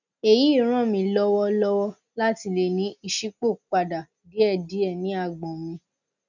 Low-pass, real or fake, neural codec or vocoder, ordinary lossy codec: 7.2 kHz; real; none; none